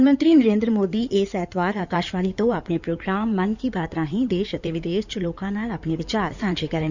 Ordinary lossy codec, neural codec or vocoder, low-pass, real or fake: none; codec, 16 kHz in and 24 kHz out, 2.2 kbps, FireRedTTS-2 codec; 7.2 kHz; fake